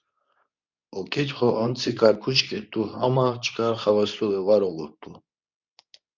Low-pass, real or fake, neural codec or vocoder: 7.2 kHz; fake; codec, 24 kHz, 0.9 kbps, WavTokenizer, medium speech release version 2